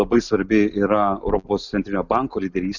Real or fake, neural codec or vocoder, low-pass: real; none; 7.2 kHz